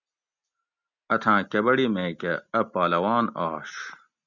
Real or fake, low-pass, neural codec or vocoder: real; 7.2 kHz; none